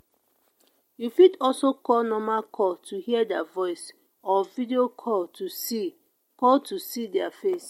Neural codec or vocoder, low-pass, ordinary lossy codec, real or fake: none; 19.8 kHz; MP3, 64 kbps; real